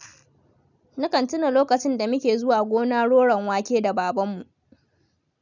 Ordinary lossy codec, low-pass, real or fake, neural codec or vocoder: none; 7.2 kHz; real; none